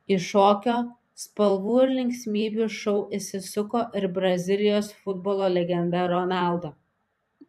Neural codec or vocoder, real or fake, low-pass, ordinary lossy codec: vocoder, 44.1 kHz, 128 mel bands every 512 samples, BigVGAN v2; fake; 14.4 kHz; AAC, 96 kbps